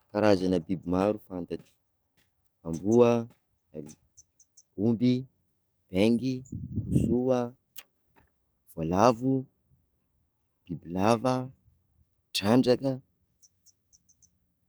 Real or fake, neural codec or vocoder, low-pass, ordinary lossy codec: fake; codec, 44.1 kHz, 7.8 kbps, DAC; none; none